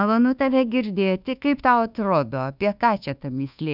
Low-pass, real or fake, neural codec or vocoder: 5.4 kHz; fake; codec, 24 kHz, 1.2 kbps, DualCodec